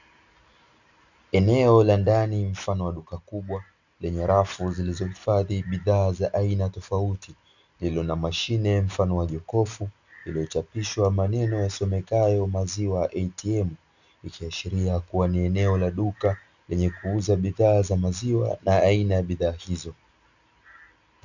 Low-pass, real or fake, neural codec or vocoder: 7.2 kHz; real; none